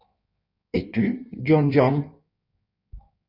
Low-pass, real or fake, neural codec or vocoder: 5.4 kHz; fake; codec, 16 kHz in and 24 kHz out, 1.1 kbps, FireRedTTS-2 codec